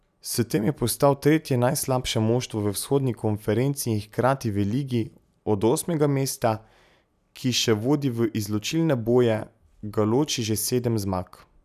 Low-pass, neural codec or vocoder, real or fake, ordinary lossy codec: 14.4 kHz; none; real; none